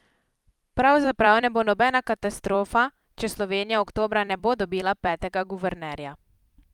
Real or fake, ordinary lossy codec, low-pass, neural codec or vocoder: fake; Opus, 32 kbps; 19.8 kHz; vocoder, 44.1 kHz, 128 mel bands every 256 samples, BigVGAN v2